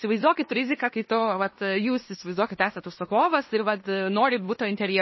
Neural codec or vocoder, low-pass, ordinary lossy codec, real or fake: codec, 16 kHz in and 24 kHz out, 0.9 kbps, LongCat-Audio-Codec, fine tuned four codebook decoder; 7.2 kHz; MP3, 24 kbps; fake